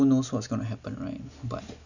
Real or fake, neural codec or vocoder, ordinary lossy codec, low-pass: real; none; none; 7.2 kHz